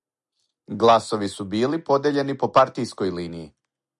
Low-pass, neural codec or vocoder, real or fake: 10.8 kHz; none; real